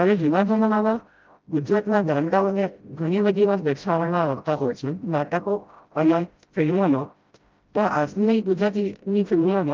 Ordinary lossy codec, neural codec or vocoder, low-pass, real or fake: Opus, 24 kbps; codec, 16 kHz, 0.5 kbps, FreqCodec, smaller model; 7.2 kHz; fake